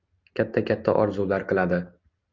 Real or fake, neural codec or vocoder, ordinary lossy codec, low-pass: real; none; Opus, 24 kbps; 7.2 kHz